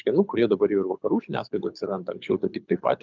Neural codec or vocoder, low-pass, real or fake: codec, 24 kHz, 6 kbps, HILCodec; 7.2 kHz; fake